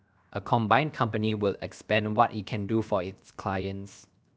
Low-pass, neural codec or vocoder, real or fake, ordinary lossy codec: none; codec, 16 kHz, 0.7 kbps, FocalCodec; fake; none